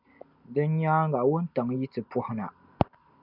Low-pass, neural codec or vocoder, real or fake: 5.4 kHz; none; real